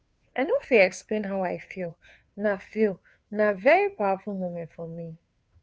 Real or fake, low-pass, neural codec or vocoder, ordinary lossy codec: fake; none; codec, 16 kHz, 2 kbps, FunCodec, trained on Chinese and English, 25 frames a second; none